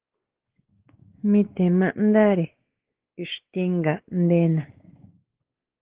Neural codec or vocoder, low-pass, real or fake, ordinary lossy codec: codec, 16 kHz, 4 kbps, X-Codec, WavLM features, trained on Multilingual LibriSpeech; 3.6 kHz; fake; Opus, 16 kbps